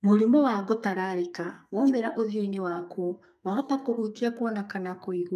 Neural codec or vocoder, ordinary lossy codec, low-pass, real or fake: codec, 32 kHz, 1.9 kbps, SNAC; none; 14.4 kHz; fake